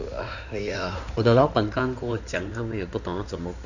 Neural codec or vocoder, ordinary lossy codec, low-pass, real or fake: codec, 16 kHz in and 24 kHz out, 2.2 kbps, FireRedTTS-2 codec; none; 7.2 kHz; fake